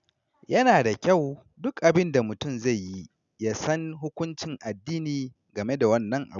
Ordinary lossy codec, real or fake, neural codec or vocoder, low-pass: none; real; none; 7.2 kHz